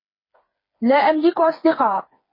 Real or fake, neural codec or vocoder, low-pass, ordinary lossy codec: fake; codec, 16 kHz, 4 kbps, FreqCodec, smaller model; 5.4 kHz; MP3, 24 kbps